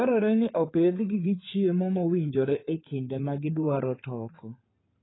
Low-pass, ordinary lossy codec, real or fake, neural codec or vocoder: 7.2 kHz; AAC, 16 kbps; fake; codec, 16 kHz in and 24 kHz out, 2.2 kbps, FireRedTTS-2 codec